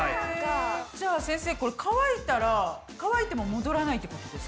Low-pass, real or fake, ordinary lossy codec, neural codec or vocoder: none; real; none; none